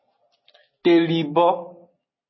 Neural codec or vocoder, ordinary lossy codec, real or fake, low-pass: none; MP3, 24 kbps; real; 7.2 kHz